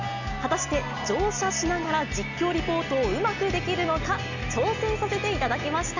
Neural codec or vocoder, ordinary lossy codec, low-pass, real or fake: none; none; 7.2 kHz; real